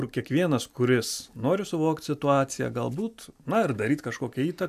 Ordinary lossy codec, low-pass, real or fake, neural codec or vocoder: AAC, 96 kbps; 14.4 kHz; real; none